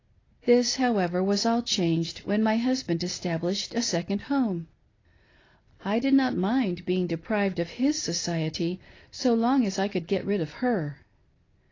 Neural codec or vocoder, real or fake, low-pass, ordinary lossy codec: none; real; 7.2 kHz; AAC, 32 kbps